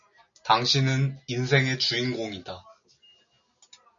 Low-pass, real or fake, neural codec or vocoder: 7.2 kHz; real; none